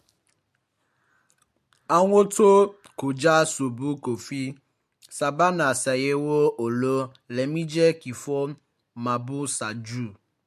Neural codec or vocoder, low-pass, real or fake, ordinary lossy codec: none; 14.4 kHz; real; MP3, 64 kbps